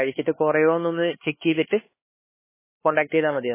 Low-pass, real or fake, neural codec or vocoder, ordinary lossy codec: 3.6 kHz; fake; codec, 16 kHz, 4 kbps, X-Codec, HuBERT features, trained on LibriSpeech; MP3, 16 kbps